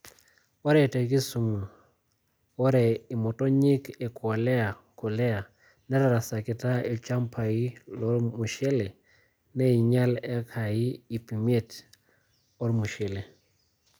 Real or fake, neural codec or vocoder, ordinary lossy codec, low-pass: real; none; none; none